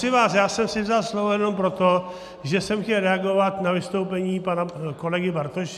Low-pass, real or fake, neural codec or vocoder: 14.4 kHz; real; none